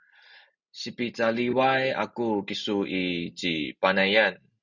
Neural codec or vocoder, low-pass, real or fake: none; 7.2 kHz; real